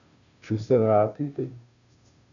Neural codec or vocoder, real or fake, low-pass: codec, 16 kHz, 0.5 kbps, FunCodec, trained on Chinese and English, 25 frames a second; fake; 7.2 kHz